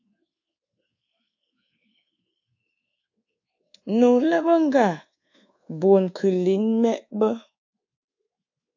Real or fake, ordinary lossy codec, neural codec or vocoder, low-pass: fake; AAC, 48 kbps; codec, 24 kHz, 1.2 kbps, DualCodec; 7.2 kHz